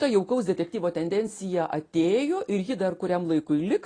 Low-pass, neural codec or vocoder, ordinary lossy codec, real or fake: 9.9 kHz; none; AAC, 32 kbps; real